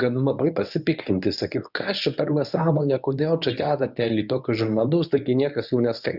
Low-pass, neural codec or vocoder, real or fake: 5.4 kHz; codec, 24 kHz, 0.9 kbps, WavTokenizer, medium speech release version 1; fake